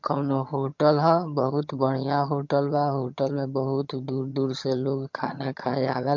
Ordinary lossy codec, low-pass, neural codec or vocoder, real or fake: MP3, 48 kbps; 7.2 kHz; vocoder, 22.05 kHz, 80 mel bands, HiFi-GAN; fake